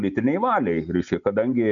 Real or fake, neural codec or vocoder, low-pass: real; none; 7.2 kHz